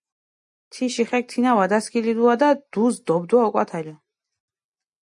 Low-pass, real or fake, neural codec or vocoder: 10.8 kHz; real; none